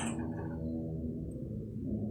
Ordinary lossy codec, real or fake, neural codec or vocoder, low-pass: none; real; none; 19.8 kHz